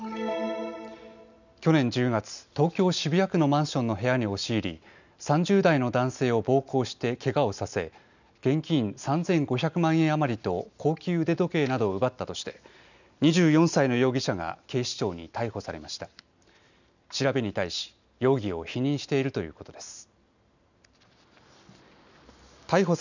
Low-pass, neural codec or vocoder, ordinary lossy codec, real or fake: 7.2 kHz; none; none; real